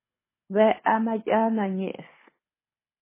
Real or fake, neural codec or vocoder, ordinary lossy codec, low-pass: fake; codec, 24 kHz, 6 kbps, HILCodec; MP3, 16 kbps; 3.6 kHz